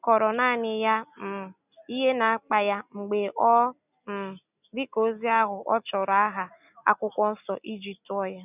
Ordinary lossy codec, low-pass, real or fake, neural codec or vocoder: none; 3.6 kHz; real; none